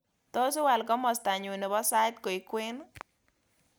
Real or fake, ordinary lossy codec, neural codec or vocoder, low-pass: real; none; none; none